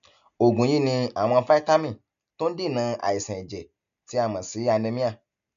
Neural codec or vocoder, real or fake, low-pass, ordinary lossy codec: none; real; 7.2 kHz; none